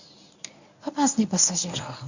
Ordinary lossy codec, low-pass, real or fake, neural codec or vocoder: none; 7.2 kHz; fake; codec, 24 kHz, 0.9 kbps, WavTokenizer, medium speech release version 1